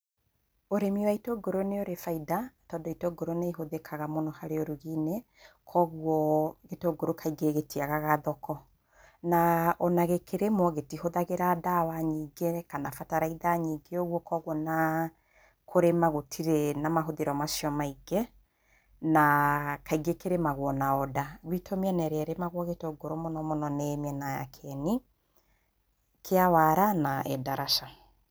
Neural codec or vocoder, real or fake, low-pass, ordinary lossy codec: none; real; none; none